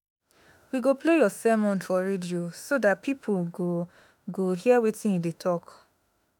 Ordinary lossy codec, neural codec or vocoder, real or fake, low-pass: none; autoencoder, 48 kHz, 32 numbers a frame, DAC-VAE, trained on Japanese speech; fake; none